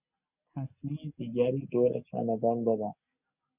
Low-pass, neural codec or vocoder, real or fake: 3.6 kHz; none; real